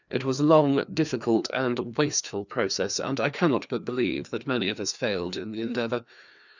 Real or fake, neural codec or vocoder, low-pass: fake; codec, 16 kHz, 2 kbps, FreqCodec, larger model; 7.2 kHz